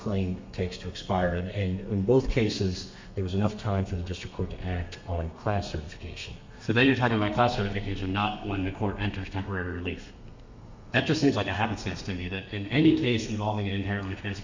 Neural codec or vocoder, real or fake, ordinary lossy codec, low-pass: codec, 32 kHz, 1.9 kbps, SNAC; fake; MP3, 48 kbps; 7.2 kHz